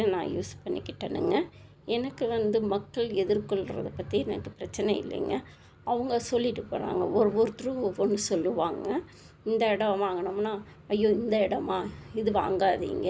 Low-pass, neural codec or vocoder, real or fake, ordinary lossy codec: none; none; real; none